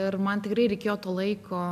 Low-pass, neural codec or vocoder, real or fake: 14.4 kHz; none; real